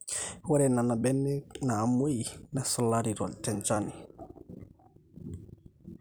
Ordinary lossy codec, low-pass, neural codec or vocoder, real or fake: none; none; vocoder, 44.1 kHz, 128 mel bands every 256 samples, BigVGAN v2; fake